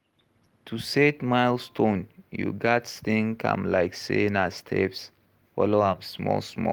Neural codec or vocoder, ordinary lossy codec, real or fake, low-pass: none; Opus, 24 kbps; real; 19.8 kHz